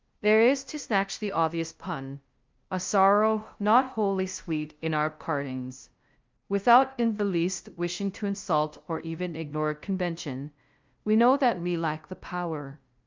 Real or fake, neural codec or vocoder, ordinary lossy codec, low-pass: fake; codec, 16 kHz, 0.5 kbps, FunCodec, trained on LibriTTS, 25 frames a second; Opus, 24 kbps; 7.2 kHz